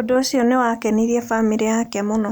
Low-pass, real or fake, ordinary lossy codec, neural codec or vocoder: none; real; none; none